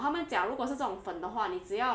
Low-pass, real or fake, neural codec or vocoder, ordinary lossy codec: none; real; none; none